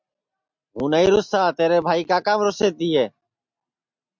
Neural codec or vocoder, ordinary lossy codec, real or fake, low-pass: none; MP3, 64 kbps; real; 7.2 kHz